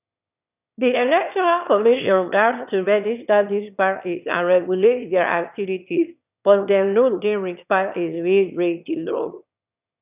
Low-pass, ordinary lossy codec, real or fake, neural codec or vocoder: 3.6 kHz; none; fake; autoencoder, 22.05 kHz, a latent of 192 numbers a frame, VITS, trained on one speaker